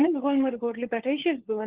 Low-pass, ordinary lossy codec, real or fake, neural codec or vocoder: 3.6 kHz; Opus, 16 kbps; fake; codec, 16 kHz, 4 kbps, FunCodec, trained on LibriTTS, 50 frames a second